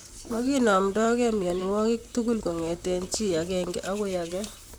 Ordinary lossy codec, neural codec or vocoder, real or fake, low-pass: none; vocoder, 44.1 kHz, 128 mel bands, Pupu-Vocoder; fake; none